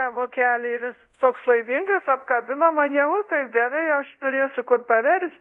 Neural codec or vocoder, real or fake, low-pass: codec, 24 kHz, 0.5 kbps, DualCodec; fake; 10.8 kHz